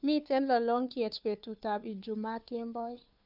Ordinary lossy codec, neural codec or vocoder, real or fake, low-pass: none; codec, 16 kHz, 2 kbps, FunCodec, trained on Chinese and English, 25 frames a second; fake; 5.4 kHz